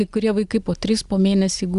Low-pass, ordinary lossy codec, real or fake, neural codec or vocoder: 10.8 kHz; Opus, 64 kbps; real; none